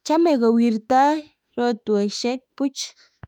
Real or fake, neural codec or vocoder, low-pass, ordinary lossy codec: fake; autoencoder, 48 kHz, 32 numbers a frame, DAC-VAE, trained on Japanese speech; 19.8 kHz; none